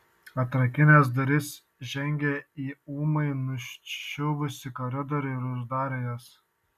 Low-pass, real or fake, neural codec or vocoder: 14.4 kHz; real; none